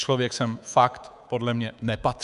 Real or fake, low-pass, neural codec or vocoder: fake; 10.8 kHz; codec, 24 kHz, 3.1 kbps, DualCodec